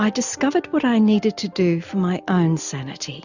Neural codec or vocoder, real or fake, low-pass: none; real; 7.2 kHz